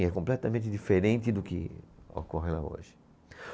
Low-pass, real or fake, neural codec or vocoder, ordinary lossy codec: none; real; none; none